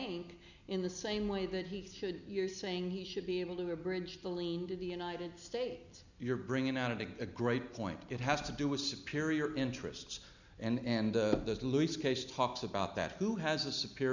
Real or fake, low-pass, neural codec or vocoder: real; 7.2 kHz; none